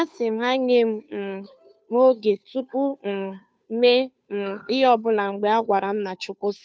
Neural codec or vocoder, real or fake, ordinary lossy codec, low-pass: codec, 16 kHz, 2 kbps, FunCodec, trained on Chinese and English, 25 frames a second; fake; none; none